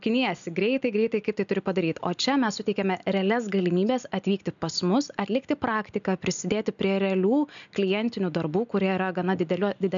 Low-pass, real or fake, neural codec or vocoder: 7.2 kHz; real; none